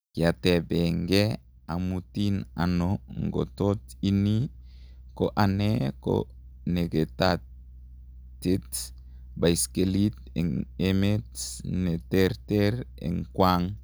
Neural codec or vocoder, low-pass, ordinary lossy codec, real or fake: none; none; none; real